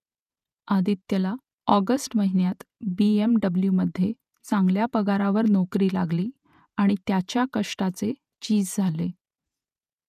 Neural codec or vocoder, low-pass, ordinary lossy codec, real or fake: vocoder, 48 kHz, 128 mel bands, Vocos; 14.4 kHz; none; fake